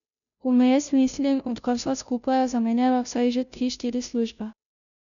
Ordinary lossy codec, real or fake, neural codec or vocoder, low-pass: none; fake; codec, 16 kHz, 0.5 kbps, FunCodec, trained on Chinese and English, 25 frames a second; 7.2 kHz